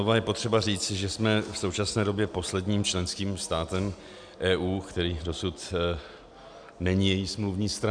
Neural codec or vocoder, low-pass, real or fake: none; 9.9 kHz; real